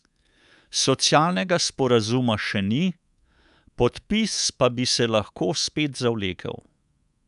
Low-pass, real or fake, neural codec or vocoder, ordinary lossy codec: 10.8 kHz; fake; codec, 24 kHz, 3.1 kbps, DualCodec; none